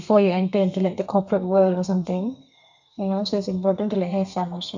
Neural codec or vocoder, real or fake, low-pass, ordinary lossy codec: codec, 32 kHz, 1.9 kbps, SNAC; fake; 7.2 kHz; MP3, 64 kbps